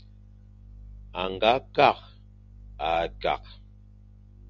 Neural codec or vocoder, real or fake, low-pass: none; real; 7.2 kHz